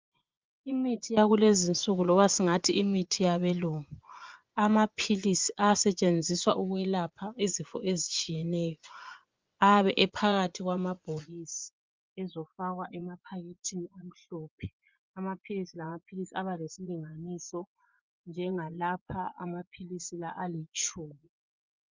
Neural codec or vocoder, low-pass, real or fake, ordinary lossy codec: vocoder, 24 kHz, 100 mel bands, Vocos; 7.2 kHz; fake; Opus, 24 kbps